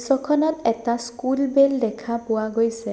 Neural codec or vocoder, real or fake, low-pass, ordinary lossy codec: none; real; none; none